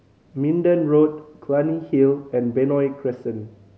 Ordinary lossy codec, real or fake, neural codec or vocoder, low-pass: none; real; none; none